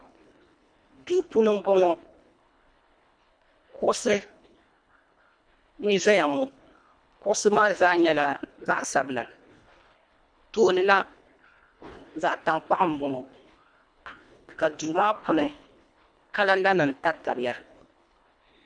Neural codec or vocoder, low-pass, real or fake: codec, 24 kHz, 1.5 kbps, HILCodec; 9.9 kHz; fake